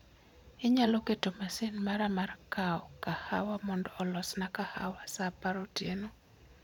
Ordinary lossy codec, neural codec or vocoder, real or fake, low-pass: none; vocoder, 44.1 kHz, 128 mel bands every 512 samples, BigVGAN v2; fake; 19.8 kHz